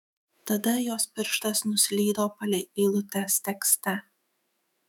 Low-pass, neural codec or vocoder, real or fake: 19.8 kHz; autoencoder, 48 kHz, 128 numbers a frame, DAC-VAE, trained on Japanese speech; fake